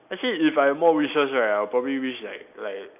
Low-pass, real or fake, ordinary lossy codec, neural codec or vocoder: 3.6 kHz; real; none; none